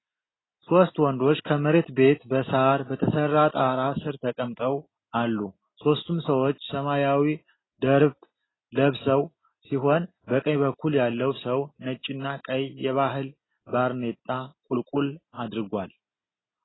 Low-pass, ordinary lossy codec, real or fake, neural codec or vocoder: 7.2 kHz; AAC, 16 kbps; real; none